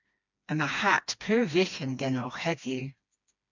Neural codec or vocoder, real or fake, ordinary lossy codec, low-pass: codec, 16 kHz, 2 kbps, FreqCodec, smaller model; fake; MP3, 64 kbps; 7.2 kHz